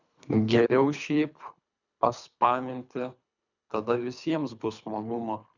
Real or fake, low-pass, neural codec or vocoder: fake; 7.2 kHz; codec, 24 kHz, 3 kbps, HILCodec